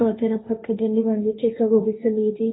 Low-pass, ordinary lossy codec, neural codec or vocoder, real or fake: 7.2 kHz; AAC, 16 kbps; codec, 16 kHz, 1.1 kbps, Voila-Tokenizer; fake